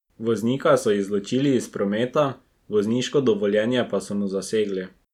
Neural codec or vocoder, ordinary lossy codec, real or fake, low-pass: none; none; real; 19.8 kHz